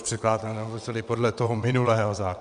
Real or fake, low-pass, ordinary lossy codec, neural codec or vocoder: fake; 9.9 kHz; MP3, 96 kbps; vocoder, 22.05 kHz, 80 mel bands, WaveNeXt